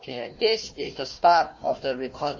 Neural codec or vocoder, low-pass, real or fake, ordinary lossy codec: codec, 16 kHz, 1 kbps, FunCodec, trained on Chinese and English, 50 frames a second; 7.2 kHz; fake; MP3, 32 kbps